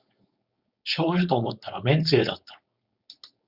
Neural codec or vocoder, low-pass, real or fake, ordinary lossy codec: codec, 16 kHz, 4.8 kbps, FACodec; 5.4 kHz; fake; Opus, 64 kbps